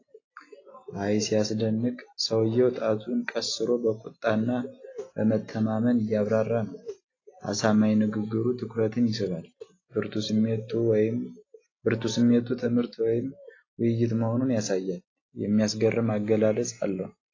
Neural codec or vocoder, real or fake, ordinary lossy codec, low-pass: none; real; AAC, 32 kbps; 7.2 kHz